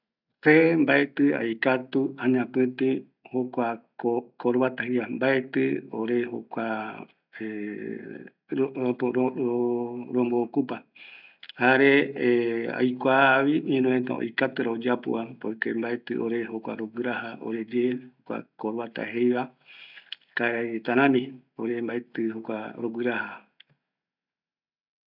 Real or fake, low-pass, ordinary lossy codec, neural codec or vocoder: real; 5.4 kHz; none; none